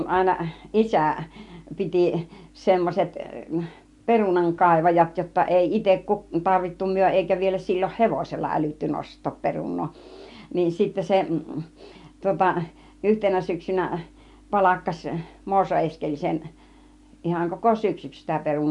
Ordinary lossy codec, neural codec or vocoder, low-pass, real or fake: MP3, 64 kbps; none; 10.8 kHz; real